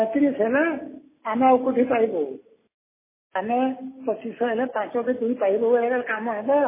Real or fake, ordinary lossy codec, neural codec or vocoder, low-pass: real; MP3, 16 kbps; none; 3.6 kHz